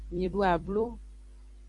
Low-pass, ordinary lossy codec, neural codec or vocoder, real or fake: 10.8 kHz; AAC, 64 kbps; vocoder, 44.1 kHz, 128 mel bands every 256 samples, BigVGAN v2; fake